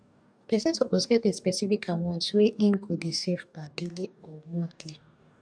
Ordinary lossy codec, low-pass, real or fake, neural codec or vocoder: none; 9.9 kHz; fake; codec, 44.1 kHz, 2.6 kbps, DAC